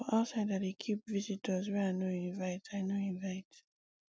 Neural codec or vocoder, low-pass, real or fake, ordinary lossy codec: none; none; real; none